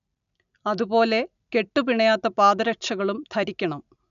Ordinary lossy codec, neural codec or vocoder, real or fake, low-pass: none; none; real; 7.2 kHz